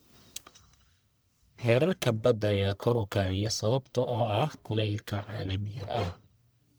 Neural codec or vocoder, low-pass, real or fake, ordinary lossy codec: codec, 44.1 kHz, 1.7 kbps, Pupu-Codec; none; fake; none